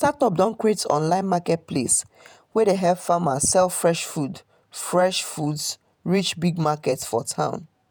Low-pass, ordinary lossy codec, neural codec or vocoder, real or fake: none; none; vocoder, 48 kHz, 128 mel bands, Vocos; fake